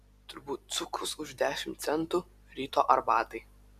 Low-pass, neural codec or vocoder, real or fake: 14.4 kHz; vocoder, 44.1 kHz, 128 mel bands every 256 samples, BigVGAN v2; fake